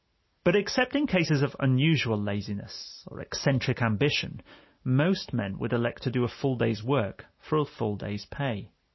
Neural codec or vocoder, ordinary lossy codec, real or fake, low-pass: none; MP3, 24 kbps; real; 7.2 kHz